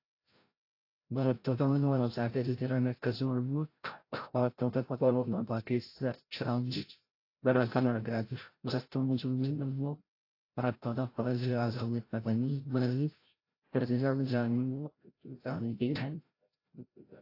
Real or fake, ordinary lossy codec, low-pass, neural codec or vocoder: fake; AAC, 32 kbps; 5.4 kHz; codec, 16 kHz, 0.5 kbps, FreqCodec, larger model